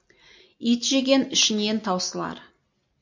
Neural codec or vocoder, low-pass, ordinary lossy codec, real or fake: none; 7.2 kHz; MP3, 48 kbps; real